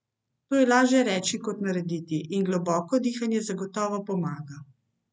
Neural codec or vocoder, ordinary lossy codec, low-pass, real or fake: none; none; none; real